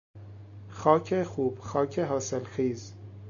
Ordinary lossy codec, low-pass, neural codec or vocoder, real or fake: AAC, 64 kbps; 7.2 kHz; none; real